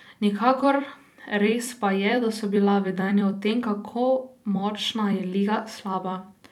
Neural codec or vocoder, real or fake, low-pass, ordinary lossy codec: vocoder, 44.1 kHz, 128 mel bands every 256 samples, BigVGAN v2; fake; 19.8 kHz; none